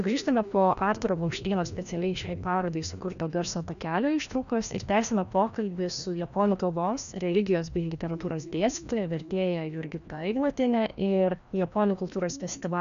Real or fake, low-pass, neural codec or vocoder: fake; 7.2 kHz; codec, 16 kHz, 1 kbps, FreqCodec, larger model